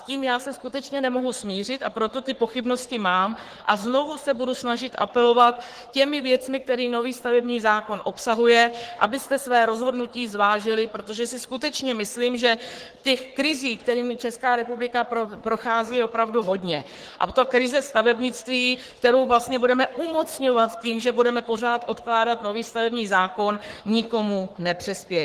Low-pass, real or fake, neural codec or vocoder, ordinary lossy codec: 14.4 kHz; fake; codec, 44.1 kHz, 3.4 kbps, Pupu-Codec; Opus, 16 kbps